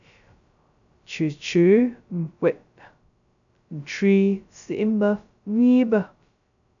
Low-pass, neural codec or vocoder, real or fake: 7.2 kHz; codec, 16 kHz, 0.2 kbps, FocalCodec; fake